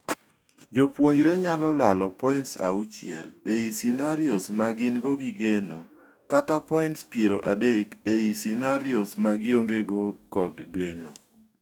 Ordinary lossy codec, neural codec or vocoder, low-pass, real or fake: none; codec, 44.1 kHz, 2.6 kbps, DAC; 19.8 kHz; fake